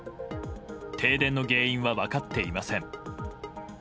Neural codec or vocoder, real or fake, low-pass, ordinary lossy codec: none; real; none; none